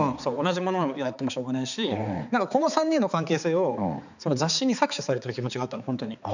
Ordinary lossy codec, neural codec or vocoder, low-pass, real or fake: none; codec, 16 kHz, 4 kbps, X-Codec, HuBERT features, trained on balanced general audio; 7.2 kHz; fake